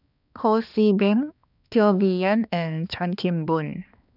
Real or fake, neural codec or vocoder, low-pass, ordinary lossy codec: fake; codec, 16 kHz, 2 kbps, X-Codec, HuBERT features, trained on balanced general audio; 5.4 kHz; none